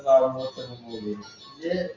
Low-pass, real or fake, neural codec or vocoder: 7.2 kHz; real; none